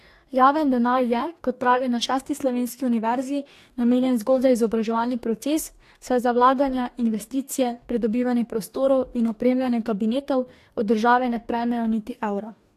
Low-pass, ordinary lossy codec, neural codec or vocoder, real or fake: 14.4 kHz; AAC, 64 kbps; codec, 44.1 kHz, 2.6 kbps, DAC; fake